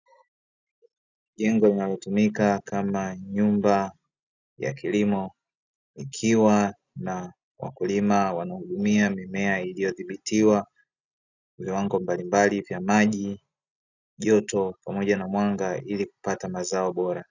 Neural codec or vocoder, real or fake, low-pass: none; real; 7.2 kHz